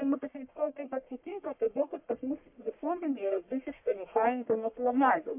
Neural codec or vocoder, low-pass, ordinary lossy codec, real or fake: codec, 44.1 kHz, 1.7 kbps, Pupu-Codec; 3.6 kHz; MP3, 32 kbps; fake